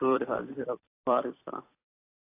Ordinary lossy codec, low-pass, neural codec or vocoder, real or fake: AAC, 24 kbps; 3.6 kHz; vocoder, 44.1 kHz, 128 mel bands every 256 samples, BigVGAN v2; fake